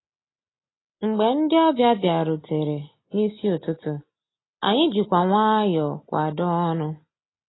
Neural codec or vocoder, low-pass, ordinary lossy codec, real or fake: none; 7.2 kHz; AAC, 16 kbps; real